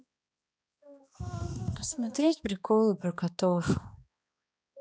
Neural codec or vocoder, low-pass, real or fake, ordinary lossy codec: codec, 16 kHz, 2 kbps, X-Codec, HuBERT features, trained on balanced general audio; none; fake; none